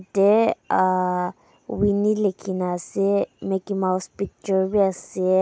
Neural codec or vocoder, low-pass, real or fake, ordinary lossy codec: none; none; real; none